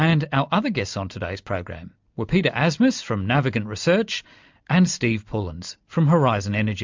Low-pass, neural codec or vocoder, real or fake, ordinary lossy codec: 7.2 kHz; none; real; MP3, 64 kbps